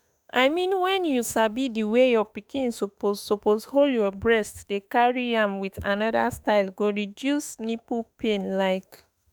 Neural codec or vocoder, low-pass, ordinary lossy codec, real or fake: autoencoder, 48 kHz, 32 numbers a frame, DAC-VAE, trained on Japanese speech; none; none; fake